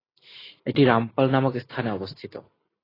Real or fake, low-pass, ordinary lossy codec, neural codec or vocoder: real; 5.4 kHz; AAC, 24 kbps; none